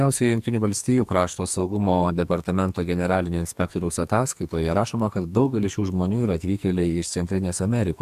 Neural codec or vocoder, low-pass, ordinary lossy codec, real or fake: codec, 44.1 kHz, 2.6 kbps, SNAC; 14.4 kHz; AAC, 96 kbps; fake